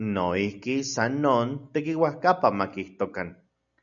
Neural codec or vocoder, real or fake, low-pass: none; real; 7.2 kHz